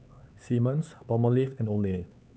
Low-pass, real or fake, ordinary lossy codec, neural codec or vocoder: none; fake; none; codec, 16 kHz, 4 kbps, X-Codec, HuBERT features, trained on LibriSpeech